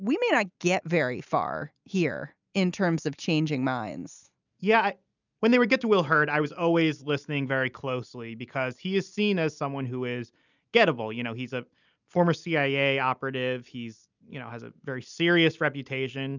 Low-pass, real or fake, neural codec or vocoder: 7.2 kHz; real; none